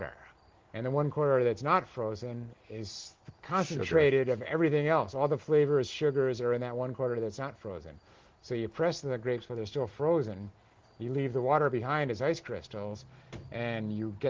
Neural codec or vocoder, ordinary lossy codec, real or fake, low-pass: none; Opus, 16 kbps; real; 7.2 kHz